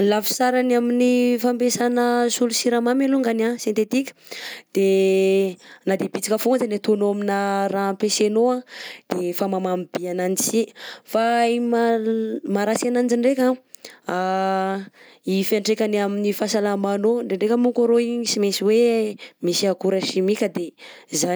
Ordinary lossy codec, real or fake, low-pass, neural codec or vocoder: none; real; none; none